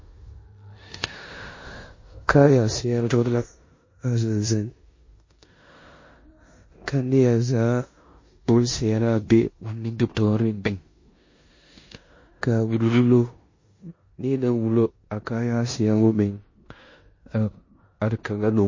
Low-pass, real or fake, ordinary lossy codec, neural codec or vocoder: 7.2 kHz; fake; MP3, 32 kbps; codec, 16 kHz in and 24 kHz out, 0.9 kbps, LongCat-Audio-Codec, four codebook decoder